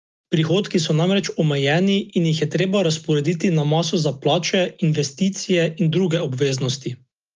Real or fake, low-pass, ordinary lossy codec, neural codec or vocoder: real; 7.2 kHz; Opus, 32 kbps; none